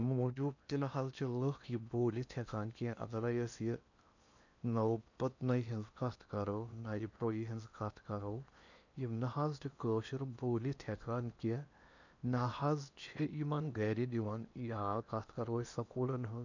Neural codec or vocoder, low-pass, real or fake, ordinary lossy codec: codec, 16 kHz in and 24 kHz out, 0.8 kbps, FocalCodec, streaming, 65536 codes; 7.2 kHz; fake; none